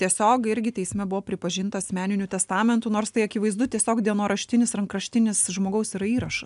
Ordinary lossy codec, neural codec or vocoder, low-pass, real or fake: MP3, 96 kbps; none; 10.8 kHz; real